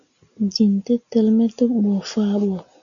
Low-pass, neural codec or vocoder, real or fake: 7.2 kHz; none; real